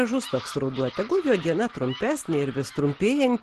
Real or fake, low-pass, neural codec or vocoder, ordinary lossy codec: real; 10.8 kHz; none; Opus, 16 kbps